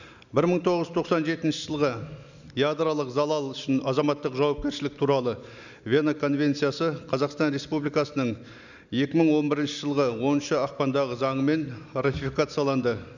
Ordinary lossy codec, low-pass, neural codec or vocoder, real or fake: none; 7.2 kHz; none; real